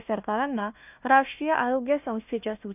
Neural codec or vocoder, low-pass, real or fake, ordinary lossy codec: codec, 16 kHz, 1 kbps, FunCodec, trained on Chinese and English, 50 frames a second; 3.6 kHz; fake; none